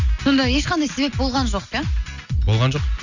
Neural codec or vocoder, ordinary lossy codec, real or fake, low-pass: none; none; real; 7.2 kHz